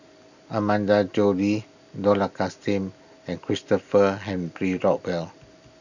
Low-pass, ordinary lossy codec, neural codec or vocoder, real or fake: 7.2 kHz; none; none; real